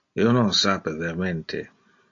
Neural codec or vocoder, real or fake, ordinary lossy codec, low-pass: none; real; AAC, 48 kbps; 7.2 kHz